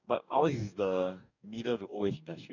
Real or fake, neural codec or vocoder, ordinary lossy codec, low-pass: fake; codec, 44.1 kHz, 2.6 kbps, DAC; none; 7.2 kHz